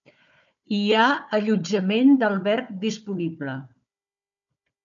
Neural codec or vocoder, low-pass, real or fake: codec, 16 kHz, 4 kbps, FunCodec, trained on Chinese and English, 50 frames a second; 7.2 kHz; fake